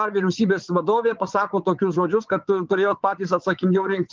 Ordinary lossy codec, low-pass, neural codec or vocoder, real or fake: Opus, 32 kbps; 7.2 kHz; vocoder, 22.05 kHz, 80 mel bands, WaveNeXt; fake